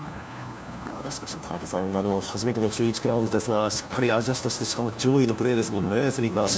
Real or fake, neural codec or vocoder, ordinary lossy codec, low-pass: fake; codec, 16 kHz, 1 kbps, FunCodec, trained on LibriTTS, 50 frames a second; none; none